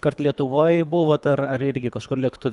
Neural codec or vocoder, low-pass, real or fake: codec, 24 kHz, 3 kbps, HILCodec; 10.8 kHz; fake